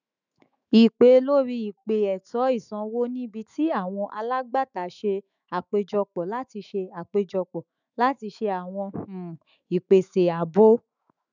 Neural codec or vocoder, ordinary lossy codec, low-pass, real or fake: autoencoder, 48 kHz, 128 numbers a frame, DAC-VAE, trained on Japanese speech; none; 7.2 kHz; fake